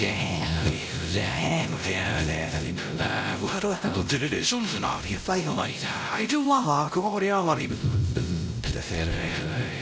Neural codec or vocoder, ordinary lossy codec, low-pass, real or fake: codec, 16 kHz, 0.5 kbps, X-Codec, WavLM features, trained on Multilingual LibriSpeech; none; none; fake